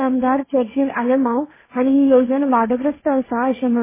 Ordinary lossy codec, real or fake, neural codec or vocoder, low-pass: MP3, 16 kbps; fake; codec, 16 kHz, 1.1 kbps, Voila-Tokenizer; 3.6 kHz